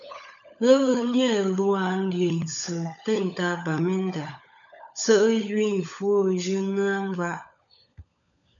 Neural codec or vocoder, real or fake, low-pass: codec, 16 kHz, 16 kbps, FunCodec, trained on LibriTTS, 50 frames a second; fake; 7.2 kHz